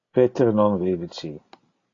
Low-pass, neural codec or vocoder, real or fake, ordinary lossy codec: 7.2 kHz; none; real; AAC, 32 kbps